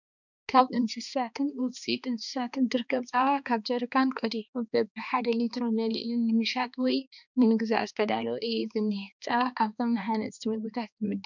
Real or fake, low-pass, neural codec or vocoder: fake; 7.2 kHz; codec, 16 kHz, 2 kbps, X-Codec, HuBERT features, trained on balanced general audio